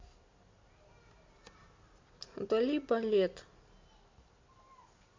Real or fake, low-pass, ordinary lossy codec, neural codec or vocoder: real; 7.2 kHz; MP3, 48 kbps; none